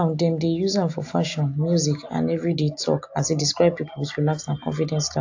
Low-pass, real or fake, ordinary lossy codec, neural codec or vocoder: 7.2 kHz; real; AAC, 48 kbps; none